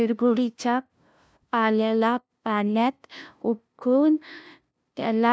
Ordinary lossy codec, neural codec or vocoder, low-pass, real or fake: none; codec, 16 kHz, 0.5 kbps, FunCodec, trained on LibriTTS, 25 frames a second; none; fake